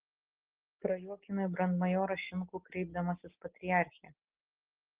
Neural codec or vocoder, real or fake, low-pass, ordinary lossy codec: none; real; 3.6 kHz; Opus, 16 kbps